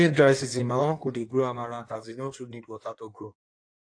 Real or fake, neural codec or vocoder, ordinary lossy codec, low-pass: fake; codec, 16 kHz in and 24 kHz out, 1.1 kbps, FireRedTTS-2 codec; AAC, 48 kbps; 9.9 kHz